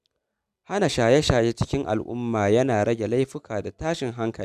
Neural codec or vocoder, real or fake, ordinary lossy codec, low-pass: none; real; none; 14.4 kHz